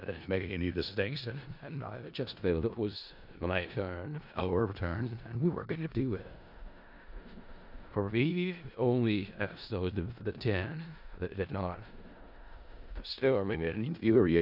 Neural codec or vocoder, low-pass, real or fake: codec, 16 kHz in and 24 kHz out, 0.4 kbps, LongCat-Audio-Codec, four codebook decoder; 5.4 kHz; fake